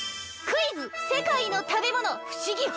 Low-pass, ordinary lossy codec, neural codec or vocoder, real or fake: none; none; none; real